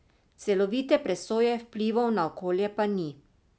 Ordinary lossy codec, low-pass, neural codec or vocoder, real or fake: none; none; none; real